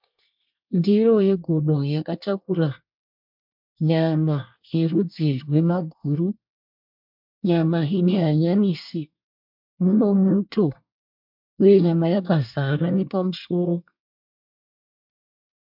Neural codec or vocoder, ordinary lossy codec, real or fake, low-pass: codec, 24 kHz, 1 kbps, SNAC; MP3, 48 kbps; fake; 5.4 kHz